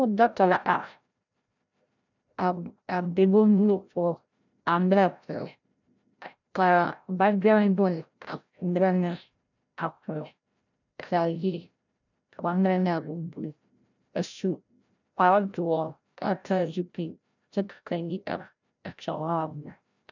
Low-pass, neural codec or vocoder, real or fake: 7.2 kHz; codec, 16 kHz, 0.5 kbps, FreqCodec, larger model; fake